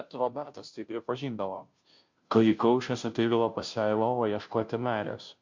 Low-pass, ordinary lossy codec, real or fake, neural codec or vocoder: 7.2 kHz; MP3, 48 kbps; fake; codec, 16 kHz, 0.5 kbps, FunCodec, trained on Chinese and English, 25 frames a second